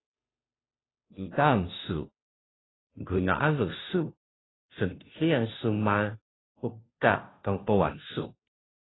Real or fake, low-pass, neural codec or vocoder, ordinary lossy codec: fake; 7.2 kHz; codec, 16 kHz, 0.5 kbps, FunCodec, trained on Chinese and English, 25 frames a second; AAC, 16 kbps